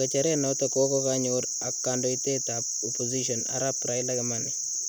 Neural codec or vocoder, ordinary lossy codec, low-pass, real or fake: none; none; none; real